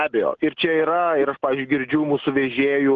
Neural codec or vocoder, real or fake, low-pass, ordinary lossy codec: none; real; 7.2 kHz; Opus, 16 kbps